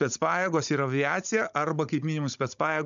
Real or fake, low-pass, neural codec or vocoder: fake; 7.2 kHz; codec, 16 kHz, 16 kbps, FunCodec, trained on Chinese and English, 50 frames a second